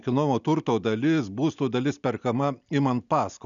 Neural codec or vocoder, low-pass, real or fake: none; 7.2 kHz; real